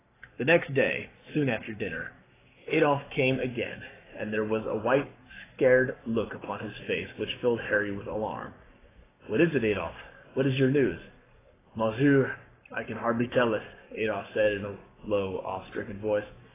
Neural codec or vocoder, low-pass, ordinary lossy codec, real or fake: codec, 44.1 kHz, 7.8 kbps, Pupu-Codec; 3.6 kHz; AAC, 16 kbps; fake